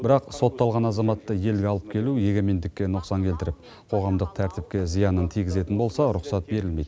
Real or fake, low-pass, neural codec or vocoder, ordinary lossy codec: real; none; none; none